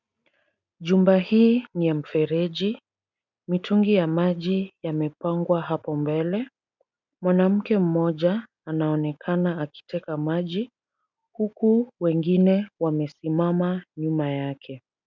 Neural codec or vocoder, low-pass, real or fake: none; 7.2 kHz; real